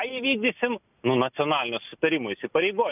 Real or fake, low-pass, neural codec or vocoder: real; 3.6 kHz; none